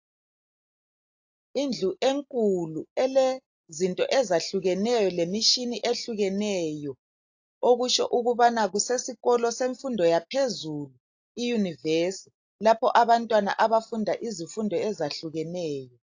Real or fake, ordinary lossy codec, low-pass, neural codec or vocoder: real; AAC, 48 kbps; 7.2 kHz; none